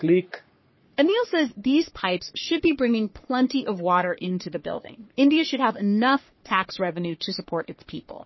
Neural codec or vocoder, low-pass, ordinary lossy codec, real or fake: codec, 44.1 kHz, 3.4 kbps, Pupu-Codec; 7.2 kHz; MP3, 24 kbps; fake